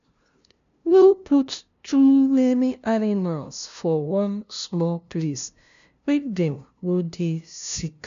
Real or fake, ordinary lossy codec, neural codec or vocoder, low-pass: fake; MP3, 64 kbps; codec, 16 kHz, 0.5 kbps, FunCodec, trained on LibriTTS, 25 frames a second; 7.2 kHz